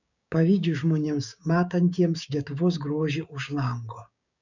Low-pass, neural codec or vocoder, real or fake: 7.2 kHz; codec, 16 kHz, 6 kbps, DAC; fake